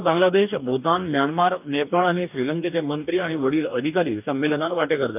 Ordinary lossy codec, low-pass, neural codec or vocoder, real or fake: none; 3.6 kHz; codec, 44.1 kHz, 2.6 kbps, DAC; fake